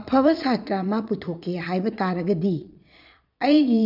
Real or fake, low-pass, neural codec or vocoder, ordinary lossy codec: fake; 5.4 kHz; vocoder, 22.05 kHz, 80 mel bands, Vocos; none